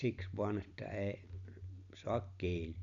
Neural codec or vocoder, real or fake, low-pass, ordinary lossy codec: none; real; 7.2 kHz; none